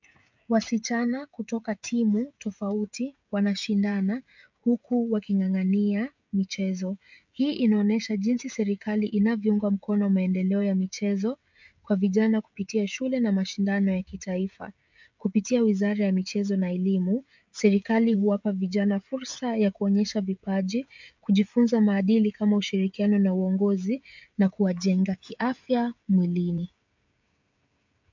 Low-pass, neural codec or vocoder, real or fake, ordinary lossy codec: 7.2 kHz; codec, 16 kHz, 16 kbps, FreqCodec, smaller model; fake; MP3, 64 kbps